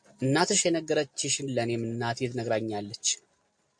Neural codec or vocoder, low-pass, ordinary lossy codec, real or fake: none; 9.9 kHz; MP3, 48 kbps; real